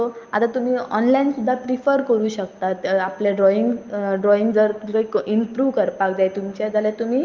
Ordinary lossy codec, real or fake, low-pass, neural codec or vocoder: Opus, 24 kbps; real; 7.2 kHz; none